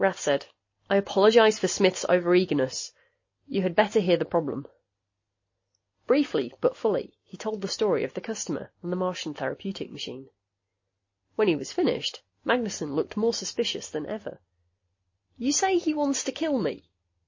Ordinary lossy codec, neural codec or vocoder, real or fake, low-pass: MP3, 32 kbps; none; real; 7.2 kHz